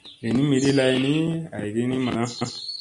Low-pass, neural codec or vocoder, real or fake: 10.8 kHz; none; real